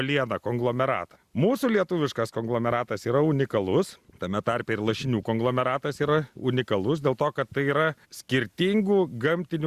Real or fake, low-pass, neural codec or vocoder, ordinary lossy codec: real; 14.4 kHz; none; Opus, 64 kbps